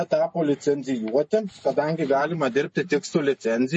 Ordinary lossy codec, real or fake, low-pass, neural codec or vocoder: MP3, 32 kbps; real; 10.8 kHz; none